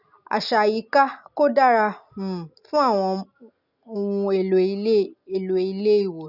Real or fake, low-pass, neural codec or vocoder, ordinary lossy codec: real; 5.4 kHz; none; none